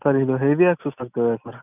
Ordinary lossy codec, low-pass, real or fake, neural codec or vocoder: none; 3.6 kHz; real; none